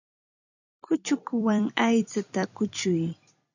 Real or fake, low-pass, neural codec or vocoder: fake; 7.2 kHz; vocoder, 44.1 kHz, 128 mel bands every 256 samples, BigVGAN v2